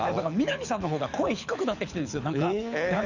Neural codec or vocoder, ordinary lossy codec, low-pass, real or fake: codec, 24 kHz, 6 kbps, HILCodec; none; 7.2 kHz; fake